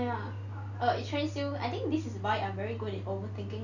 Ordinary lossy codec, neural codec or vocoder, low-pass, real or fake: none; none; 7.2 kHz; real